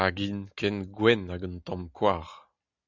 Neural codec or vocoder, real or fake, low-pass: none; real; 7.2 kHz